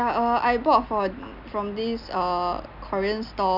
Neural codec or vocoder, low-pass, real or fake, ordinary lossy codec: none; 5.4 kHz; real; none